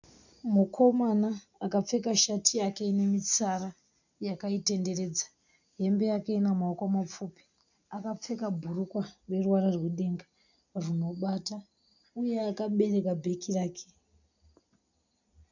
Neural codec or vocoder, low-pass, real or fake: none; 7.2 kHz; real